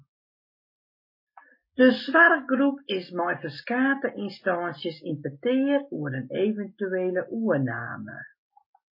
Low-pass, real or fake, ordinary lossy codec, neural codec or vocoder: 5.4 kHz; real; MP3, 24 kbps; none